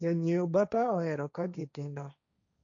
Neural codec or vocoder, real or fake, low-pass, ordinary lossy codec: codec, 16 kHz, 1.1 kbps, Voila-Tokenizer; fake; 7.2 kHz; none